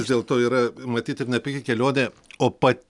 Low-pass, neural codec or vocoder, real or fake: 10.8 kHz; none; real